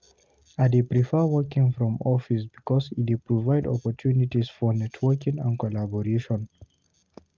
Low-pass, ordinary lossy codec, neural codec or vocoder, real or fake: 7.2 kHz; Opus, 32 kbps; none; real